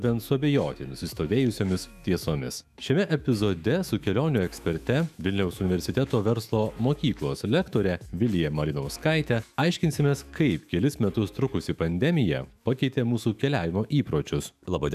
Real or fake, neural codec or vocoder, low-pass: fake; autoencoder, 48 kHz, 128 numbers a frame, DAC-VAE, trained on Japanese speech; 14.4 kHz